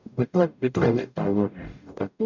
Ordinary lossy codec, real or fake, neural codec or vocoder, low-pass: none; fake; codec, 44.1 kHz, 0.9 kbps, DAC; 7.2 kHz